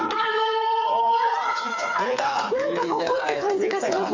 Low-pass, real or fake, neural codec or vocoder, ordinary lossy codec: 7.2 kHz; fake; codec, 16 kHz, 4 kbps, FreqCodec, smaller model; AAC, 48 kbps